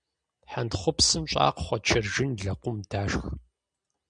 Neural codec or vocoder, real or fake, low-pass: none; real; 9.9 kHz